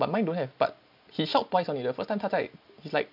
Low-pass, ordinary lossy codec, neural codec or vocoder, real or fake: 5.4 kHz; MP3, 48 kbps; none; real